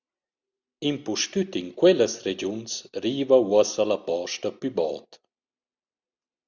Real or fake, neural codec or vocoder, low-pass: real; none; 7.2 kHz